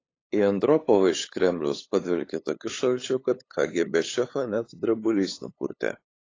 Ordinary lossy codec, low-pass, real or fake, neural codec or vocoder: AAC, 32 kbps; 7.2 kHz; fake; codec, 16 kHz, 8 kbps, FunCodec, trained on LibriTTS, 25 frames a second